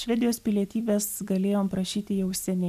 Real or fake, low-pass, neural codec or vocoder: real; 14.4 kHz; none